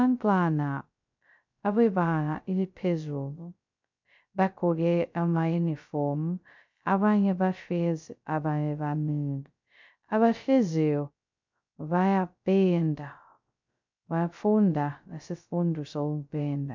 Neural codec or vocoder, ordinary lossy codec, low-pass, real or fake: codec, 16 kHz, 0.2 kbps, FocalCodec; MP3, 64 kbps; 7.2 kHz; fake